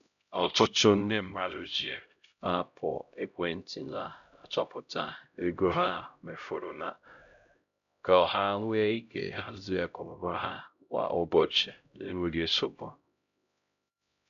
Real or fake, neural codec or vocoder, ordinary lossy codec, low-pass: fake; codec, 16 kHz, 0.5 kbps, X-Codec, HuBERT features, trained on LibriSpeech; none; 7.2 kHz